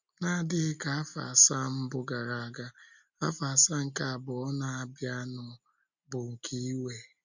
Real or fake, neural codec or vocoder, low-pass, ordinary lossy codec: real; none; 7.2 kHz; none